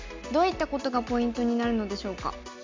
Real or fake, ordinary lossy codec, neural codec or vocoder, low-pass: real; MP3, 64 kbps; none; 7.2 kHz